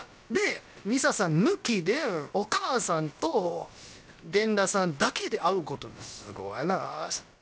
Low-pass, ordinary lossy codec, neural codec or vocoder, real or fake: none; none; codec, 16 kHz, about 1 kbps, DyCAST, with the encoder's durations; fake